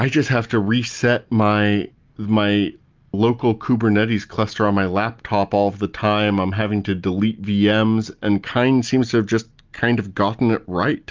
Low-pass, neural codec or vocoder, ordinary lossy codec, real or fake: 7.2 kHz; none; Opus, 24 kbps; real